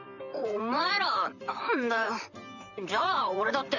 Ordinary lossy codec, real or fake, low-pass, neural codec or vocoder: none; fake; 7.2 kHz; vocoder, 44.1 kHz, 128 mel bands, Pupu-Vocoder